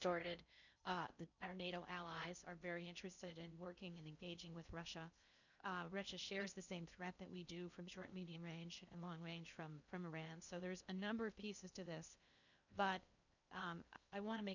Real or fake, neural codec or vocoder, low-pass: fake; codec, 16 kHz in and 24 kHz out, 0.6 kbps, FocalCodec, streaming, 4096 codes; 7.2 kHz